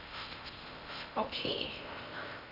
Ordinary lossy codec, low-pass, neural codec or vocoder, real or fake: none; 5.4 kHz; codec, 16 kHz in and 24 kHz out, 0.6 kbps, FocalCodec, streaming, 4096 codes; fake